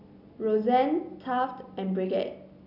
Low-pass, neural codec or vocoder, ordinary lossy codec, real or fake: 5.4 kHz; none; none; real